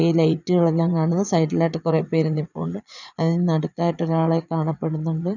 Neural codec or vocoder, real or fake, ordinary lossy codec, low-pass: vocoder, 22.05 kHz, 80 mel bands, Vocos; fake; none; 7.2 kHz